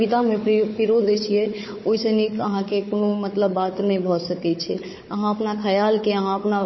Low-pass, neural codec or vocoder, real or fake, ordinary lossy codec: 7.2 kHz; codec, 16 kHz, 4 kbps, FunCodec, trained on Chinese and English, 50 frames a second; fake; MP3, 24 kbps